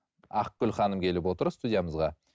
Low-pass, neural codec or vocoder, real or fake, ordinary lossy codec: none; none; real; none